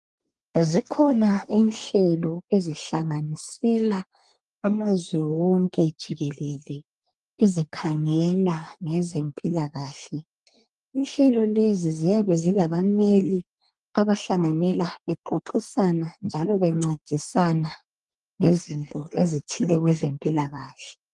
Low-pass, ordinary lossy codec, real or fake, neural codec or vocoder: 10.8 kHz; Opus, 24 kbps; fake; codec, 24 kHz, 1 kbps, SNAC